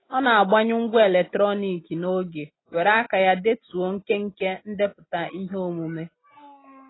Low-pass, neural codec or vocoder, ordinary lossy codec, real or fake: 7.2 kHz; none; AAC, 16 kbps; real